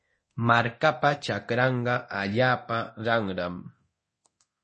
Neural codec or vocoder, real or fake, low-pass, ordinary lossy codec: codec, 24 kHz, 0.9 kbps, DualCodec; fake; 10.8 kHz; MP3, 32 kbps